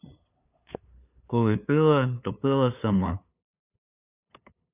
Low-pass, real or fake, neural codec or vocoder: 3.6 kHz; fake; codec, 16 kHz, 8 kbps, FunCodec, trained on LibriTTS, 25 frames a second